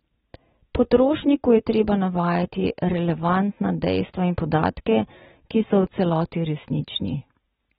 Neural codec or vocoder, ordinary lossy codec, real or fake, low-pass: none; AAC, 16 kbps; real; 7.2 kHz